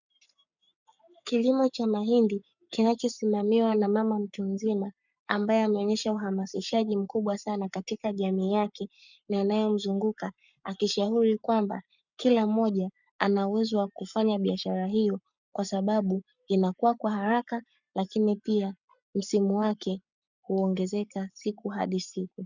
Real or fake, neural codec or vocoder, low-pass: fake; codec, 44.1 kHz, 7.8 kbps, Pupu-Codec; 7.2 kHz